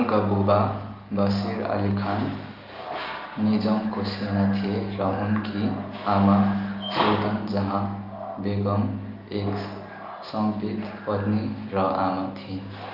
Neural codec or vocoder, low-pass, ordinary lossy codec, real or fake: none; 5.4 kHz; Opus, 24 kbps; real